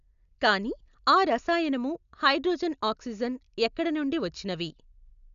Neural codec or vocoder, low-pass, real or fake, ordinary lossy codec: none; 7.2 kHz; real; none